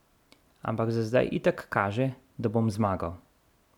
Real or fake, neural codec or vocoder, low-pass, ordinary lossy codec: real; none; 19.8 kHz; none